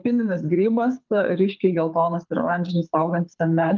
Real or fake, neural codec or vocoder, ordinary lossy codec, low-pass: fake; codec, 24 kHz, 6 kbps, HILCodec; Opus, 32 kbps; 7.2 kHz